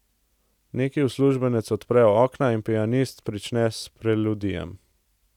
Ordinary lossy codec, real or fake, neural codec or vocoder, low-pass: none; real; none; 19.8 kHz